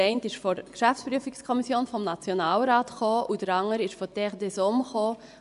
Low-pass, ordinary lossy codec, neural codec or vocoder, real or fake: 10.8 kHz; none; vocoder, 24 kHz, 100 mel bands, Vocos; fake